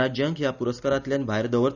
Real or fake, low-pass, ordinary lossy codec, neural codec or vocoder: real; 7.2 kHz; none; none